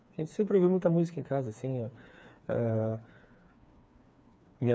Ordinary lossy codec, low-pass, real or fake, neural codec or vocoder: none; none; fake; codec, 16 kHz, 4 kbps, FreqCodec, smaller model